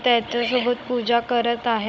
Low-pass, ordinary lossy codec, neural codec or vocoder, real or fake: none; none; codec, 16 kHz, 16 kbps, FunCodec, trained on Chinese and English, 50 frames a second; fake